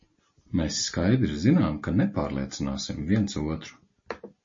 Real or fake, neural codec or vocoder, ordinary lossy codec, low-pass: real; none; MP3, 32 kbps; 7.2 kHz